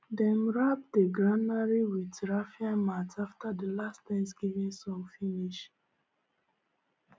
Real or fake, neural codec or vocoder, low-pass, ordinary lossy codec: real; none; none; none